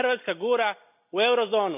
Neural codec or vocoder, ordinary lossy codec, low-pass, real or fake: none; none; 3.6 kHz; real